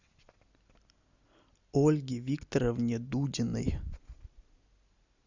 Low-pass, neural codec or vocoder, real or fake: 7.2 kHz; none; real